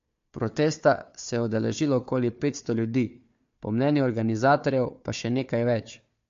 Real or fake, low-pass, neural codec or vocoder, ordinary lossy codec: fake; 7.2 kHz; codec, 16 kHz, 4 kbps, FunCodec, trained on Chinese and English, 50 frames a second; MP3, 48 kbps